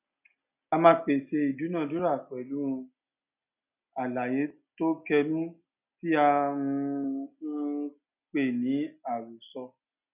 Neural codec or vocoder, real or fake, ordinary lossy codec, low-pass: none; real; none; 3.6 kHz